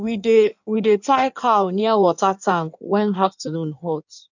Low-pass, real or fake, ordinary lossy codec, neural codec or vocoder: 7.2 kHz; fake; none; codec, 16 kHz in and 24 kHz out, 1.1 kbps, FireRedTTS-2 codec